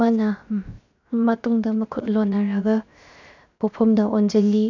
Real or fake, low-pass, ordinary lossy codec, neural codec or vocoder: fake; 7.2 kHz; none; codec, 16 kHz, 0.7 kbps, FocalCodec